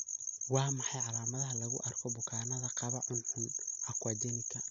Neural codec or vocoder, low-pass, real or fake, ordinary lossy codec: none; 7.2 kHz; real; none